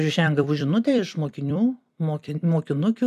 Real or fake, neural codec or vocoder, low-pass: fake; vocoder, 44.1 kHz, 128 mel bands every 256 samples, BigVGAN v2; 14.4 kHz